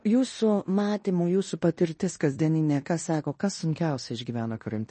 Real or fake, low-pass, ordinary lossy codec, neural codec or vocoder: fake; 10.8 kHz; MP3, 32 kbps; codec, 16 kHz in and 24 kHz out, 0.9 kbps, LongCat-Audio-Codec, fine tuned four codebook decoder